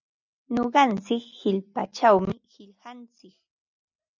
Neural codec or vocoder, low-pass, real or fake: none; 7.2 kHz; real